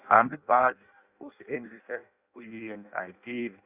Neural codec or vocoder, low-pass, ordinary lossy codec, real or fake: codec, 16 kHz in and 24 kHz out, 0.6 kbps, FireRedTTS-2 codec; 3.6 kHz; Opus, 64 kbps; fake